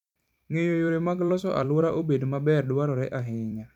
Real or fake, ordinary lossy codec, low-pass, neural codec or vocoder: fake; none; 19.8 kHz; vocoder, 44.1 kHz, 128 mel bands every 256 samples, BigVGAN v2